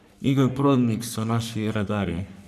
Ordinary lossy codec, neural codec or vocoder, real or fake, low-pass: none; codec, 44.1 kHz, 3.4 kbps, Pupu-Codec; fake; 14.4 kHz